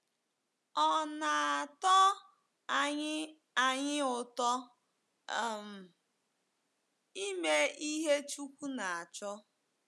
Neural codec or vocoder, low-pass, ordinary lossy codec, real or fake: none; none; none; real